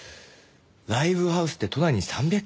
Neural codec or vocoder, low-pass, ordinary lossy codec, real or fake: none; none; none; real